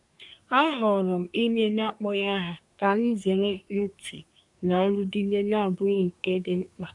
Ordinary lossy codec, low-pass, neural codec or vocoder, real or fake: none; 10.8 kHz; codec, 24 kHz, 1 kbps, SNAC; fake